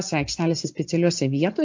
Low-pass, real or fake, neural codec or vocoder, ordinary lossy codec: 7.2 kHz; real; none; MP3, 48 kbps